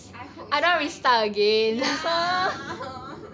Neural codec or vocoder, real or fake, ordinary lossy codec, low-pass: none; real; none; none